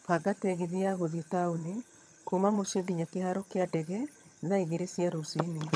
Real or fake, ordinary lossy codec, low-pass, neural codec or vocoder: fake; none; none; vocoder, 22.05 kHz, 80 mel bands, HiFi-GAN